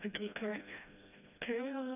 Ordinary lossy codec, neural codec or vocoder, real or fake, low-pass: none; codec, 16 kHz, 1 kbps, FreqCodec, smaller model; fake; 3.6 kHz